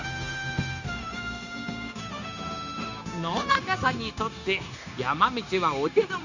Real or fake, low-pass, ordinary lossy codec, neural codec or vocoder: fake; 7.2 kHz; none; codec, 16 kHz, 0.9 kbps, LongCat-Audio-Codec